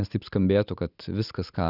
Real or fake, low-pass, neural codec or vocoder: real; 5.4 kHz; none